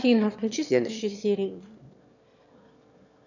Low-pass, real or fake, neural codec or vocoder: 7.2 kHz; fake; autoencoder, 22.05 kHz, a latent of 192 numbers a frame, VITS, trained on one speaker